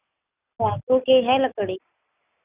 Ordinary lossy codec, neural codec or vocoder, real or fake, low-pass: Opus, 64 kbps; none; real; 3.6 kHz